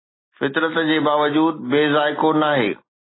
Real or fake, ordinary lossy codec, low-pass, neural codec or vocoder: real; AAC, 16 kbps; 7.2 kHz; none